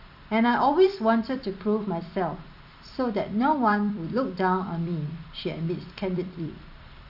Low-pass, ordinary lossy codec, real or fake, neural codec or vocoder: 5.4 kHz; none; fake; vocoder, 44.1 kHz, 128 mel bands every 256 samples, BigVGAN v2